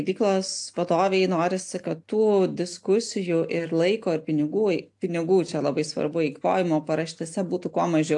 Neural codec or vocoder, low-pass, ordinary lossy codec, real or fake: none; 10.8 kHz; AAC, 64 kbps; real